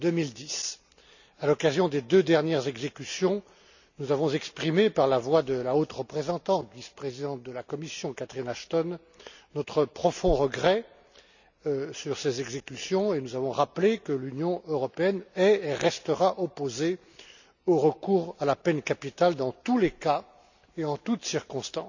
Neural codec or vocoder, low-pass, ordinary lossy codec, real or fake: none; 7.2 kHz; none; real